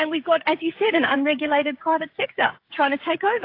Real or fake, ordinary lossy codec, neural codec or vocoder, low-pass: fake; AAC, 32 kbps; codec, 16 kHz, 8 kbps, FreqCodec, smaller model; 5.4 kHz